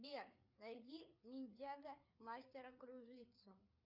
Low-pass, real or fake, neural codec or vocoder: 5.4 kHz; fake; codec, 16 kHz, 4 kbps, FreqCodec, larger model